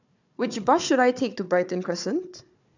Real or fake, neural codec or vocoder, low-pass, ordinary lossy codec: fake; codec, 16 kHz, 4 kbps, FunCodec, trained on Chinese and English, 50 frames a second; 7.2 kHz; none